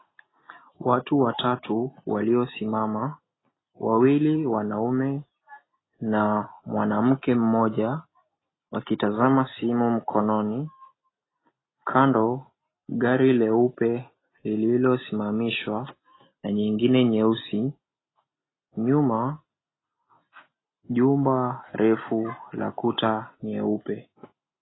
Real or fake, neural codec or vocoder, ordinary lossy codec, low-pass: real; none; AAC, 16 kbps; 7.2 kHz